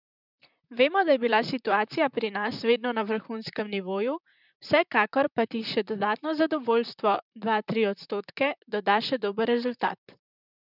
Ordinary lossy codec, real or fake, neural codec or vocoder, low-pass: none; fake; vocoder, 44.1 kHz, 128 mel bands, Pupu-Vocoder; 5.4 kHz